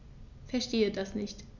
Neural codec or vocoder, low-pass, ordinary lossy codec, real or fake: none; 7.2 kHz; none; real